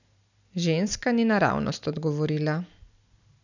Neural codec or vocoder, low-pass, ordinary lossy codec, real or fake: none; 7.2 kHz; none; real